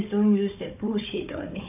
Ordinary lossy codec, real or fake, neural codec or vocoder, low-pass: none; fake; codec, 16 kHz, 4 kbps, FunCodec, trained on Chinese and English, 50 frames a second; 3.6 kHz